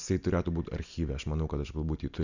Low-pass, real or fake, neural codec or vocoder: 7.2 kHz; real; none